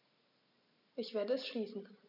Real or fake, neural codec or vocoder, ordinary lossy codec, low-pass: real; none; none; 5.4 kHz